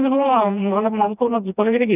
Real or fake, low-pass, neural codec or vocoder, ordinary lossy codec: fake; 3.6 kHz; codec, 16 kHz, 1 kbps, FreqCodec, smaller model; none